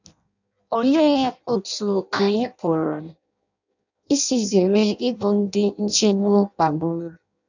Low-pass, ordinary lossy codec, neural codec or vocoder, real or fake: 7.2 kHz; none; codec, 16 kHz in and 24 kHz out, 0.6 kbps, FireRedTTS-2 codec; fake